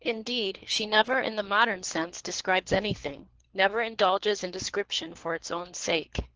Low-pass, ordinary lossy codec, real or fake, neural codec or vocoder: 7.2 kHz; Opus, 16 kbps; fake; codec, 24 kHz, 3 kbps, HILCodec